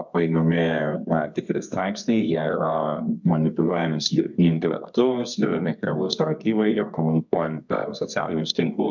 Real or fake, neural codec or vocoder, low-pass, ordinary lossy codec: fake; codec, 24 kHz, 0.9 kbps, WavTokenizer, medium music audio release; 7.2 kHz; MP3, 64 kbps